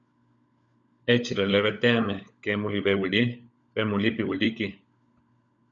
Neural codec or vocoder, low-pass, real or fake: codec, 16 kHz, 16 kbps, FunCodec, trained on LibriTTS, 50 frames a second; 7.2 kHz; fake